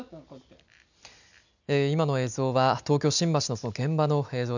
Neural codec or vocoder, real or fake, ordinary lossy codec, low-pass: none; real; none; 7.2 kHz